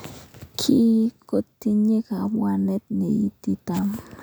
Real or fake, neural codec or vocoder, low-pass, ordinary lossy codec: real; none; none; none